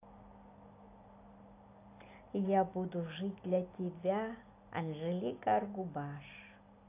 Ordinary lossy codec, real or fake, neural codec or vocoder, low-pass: AAC, 24 kbps; real; none; 3.6 kHz